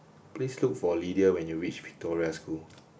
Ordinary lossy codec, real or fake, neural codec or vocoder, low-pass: none; real; none; none